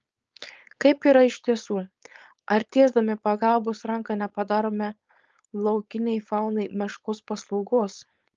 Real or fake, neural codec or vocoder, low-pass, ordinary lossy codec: fake; codec, 16 kHz, 4.8 kbps, FACodec; 7.2 kHz; Opus, 24 kbps